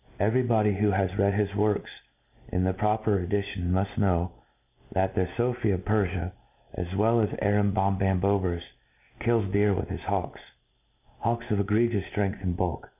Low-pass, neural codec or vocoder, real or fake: 3.6 kHz; none; real